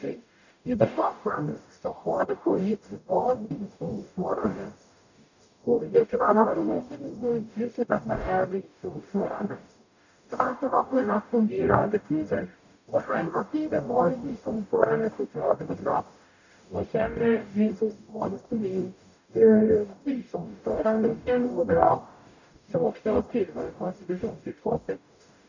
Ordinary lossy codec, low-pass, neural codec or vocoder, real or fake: none; 7.2 kHz; codec, 44.1 kHz, 0.9 kbps, DAC; fake